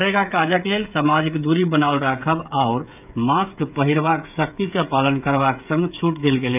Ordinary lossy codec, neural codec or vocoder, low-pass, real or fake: none; codec, 16 kHz, 16 kbps, FreqCodec, smaller model; 3.6 kHz; fake